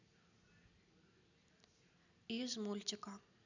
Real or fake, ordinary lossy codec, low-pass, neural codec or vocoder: real; none; 7.2 kHz; none